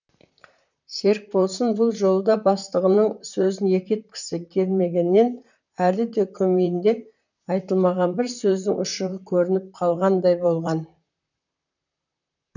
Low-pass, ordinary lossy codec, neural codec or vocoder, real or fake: 7.2 kHz; none; vocoder, 44.1 kHz, 128 mel bands, Pupu-Vocoder; fake